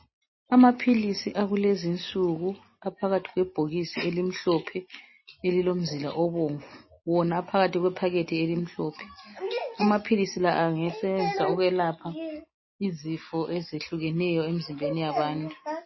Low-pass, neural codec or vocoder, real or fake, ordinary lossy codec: 7.2 kHz; none; real; MP3, 24 kbps